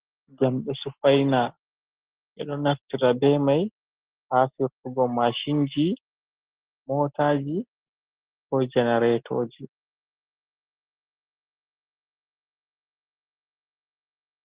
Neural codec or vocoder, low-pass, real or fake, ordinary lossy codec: none; 3.6 kHz; real; Opus, 16 kbps